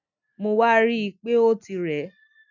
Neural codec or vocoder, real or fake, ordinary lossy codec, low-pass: none; real; none; 7.2 kHz